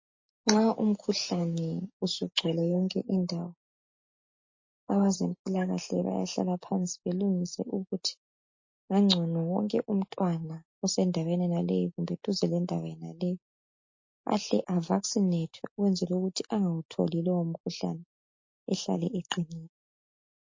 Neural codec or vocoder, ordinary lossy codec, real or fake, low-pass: none; MP3, 32 kbps; real; 7.2 kHz